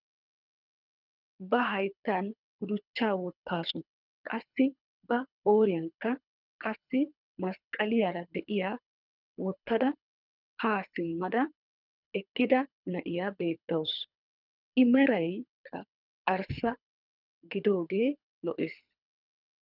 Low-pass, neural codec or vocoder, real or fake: 5.4 kHz; codec, 24 kHz, 3 kbps, HILCodec; fake